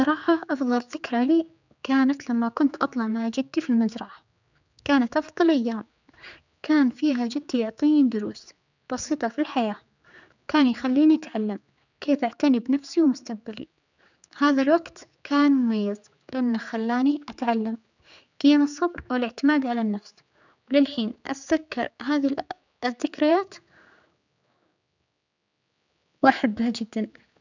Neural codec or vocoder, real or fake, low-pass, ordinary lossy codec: codec, 16 kHz, 4 kbps, X-Codec, HuBERT features, trained on general audio; fake; 7.2 kHz; none